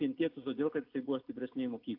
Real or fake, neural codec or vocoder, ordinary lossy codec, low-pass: real; none; AAC, 48 kbps; 5.4 kHz